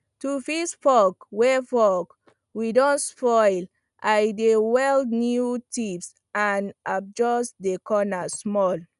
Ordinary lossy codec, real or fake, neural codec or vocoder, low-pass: none; real; none; 10.8 kHz